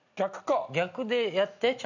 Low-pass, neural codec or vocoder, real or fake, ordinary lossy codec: 7.2 kHz; none; real; none